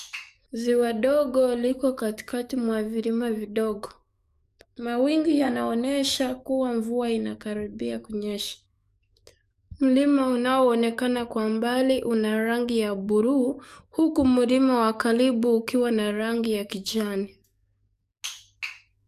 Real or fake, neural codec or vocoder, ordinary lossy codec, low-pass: fake; codec, 44.1 kHz, 7.8 kbps, DAC; none; 14.4 kHz